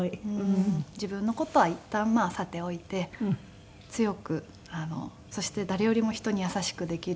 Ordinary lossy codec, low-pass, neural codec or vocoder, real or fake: none; none; none; real